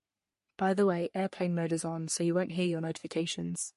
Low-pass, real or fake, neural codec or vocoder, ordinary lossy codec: 14.4 kHz; fake; codec, 44.1 kHz, 3.4 kbps, Pupu-Codec; MP3, 48 kbps